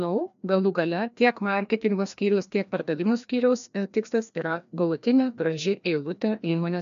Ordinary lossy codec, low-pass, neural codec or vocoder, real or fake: MP3, 96 kbps; 7.2 kHz; codec, 16 kHz, 1 kbps, FreqCodec, larger model; fake